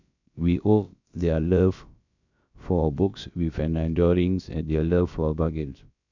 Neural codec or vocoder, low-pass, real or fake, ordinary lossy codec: codec, 16 kHz, about 1 kbps, DyCAST, with the encoder's durations; 7.2 kHz; fake; none